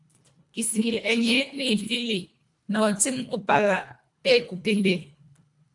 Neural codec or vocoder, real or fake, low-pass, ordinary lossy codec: codec, 24 kHz, 1.5 kbps, HILCodec; fake; 10.8 kHz; AAC, 64 kbps